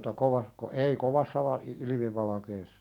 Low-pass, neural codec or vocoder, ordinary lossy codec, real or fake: 19.8 kHz; codec, 44.1 kHz, 7.8 kbps, DAC; none; fake